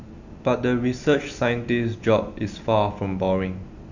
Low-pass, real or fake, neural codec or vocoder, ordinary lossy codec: 7.2 kHz; real; none; none